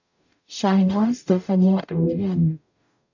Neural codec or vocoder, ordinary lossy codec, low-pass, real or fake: codec, 44.1 kHz, 0.9 kbps, DAC; none; 7.2 kHz; fake